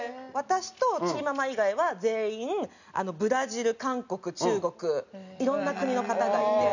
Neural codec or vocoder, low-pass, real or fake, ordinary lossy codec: none; 7.2 kHz; real; none